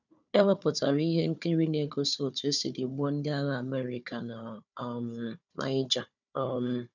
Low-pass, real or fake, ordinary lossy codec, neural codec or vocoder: 7.2 kHz; fake; none; codec, 16 kHz, 4 kbps, FunCodec, trained on Chinese and English, 50 frames a second